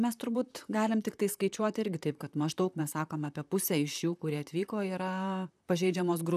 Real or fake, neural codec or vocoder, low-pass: fake; vocoder, 44.1 kHz, 128 mel bands, Pupu-Vocoder; 14.4 kHz